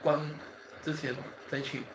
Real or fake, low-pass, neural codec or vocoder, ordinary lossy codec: fake; none; codec, 16 kHz, 4.8 kbps, FACodec; none